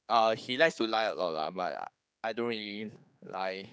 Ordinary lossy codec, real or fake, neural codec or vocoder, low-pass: none; fake; codec, 16 kHz, 4 kbps, X-Codec, HuBERT features, trained on general audio; none